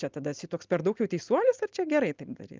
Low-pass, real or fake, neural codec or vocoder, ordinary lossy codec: 7.2 kHz; real; none; Opus, 24 kbps